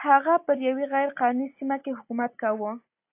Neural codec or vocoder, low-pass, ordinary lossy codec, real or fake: none; 3.6 kHz; AAC, 24 kbps; real